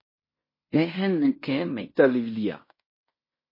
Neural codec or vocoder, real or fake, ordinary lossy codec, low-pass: codec, 16 kHz in and 24 kHz out, 0.9 kbps, LongCat-Audio-Codec, fine tuned four codebook decoder; fake; MP3, 24 kbps; 5.4 kHz